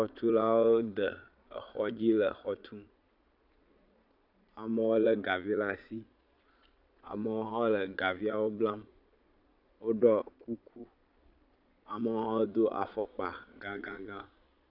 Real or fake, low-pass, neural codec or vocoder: fake; 5.4 kHz; vocoder, 22.05 kHz, 80 mel bands, Vocos